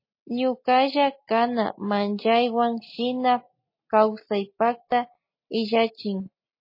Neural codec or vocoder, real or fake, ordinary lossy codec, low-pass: none; real; MP3, 24 kbps; 5.4 kHz